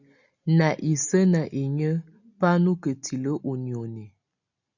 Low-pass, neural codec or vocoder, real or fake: 7.2 kHz; none; real